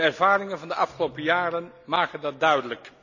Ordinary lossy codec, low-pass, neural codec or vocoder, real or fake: none; 7.2 kHz; none; real